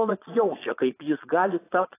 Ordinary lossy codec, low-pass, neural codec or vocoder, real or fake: AAC, 16 kbps; 3.6 kHz; vocoder, 22.05 kHz, 80 mel bands, Vocos; fake